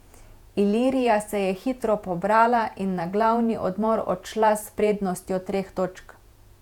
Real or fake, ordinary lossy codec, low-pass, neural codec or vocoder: fake; none; 19.8 kHz; vocoder, 48 kHz, 128 mel bands, Vocos